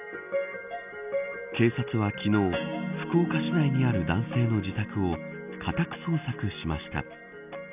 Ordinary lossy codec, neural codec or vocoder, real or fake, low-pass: none; none; real; 3.6 kHz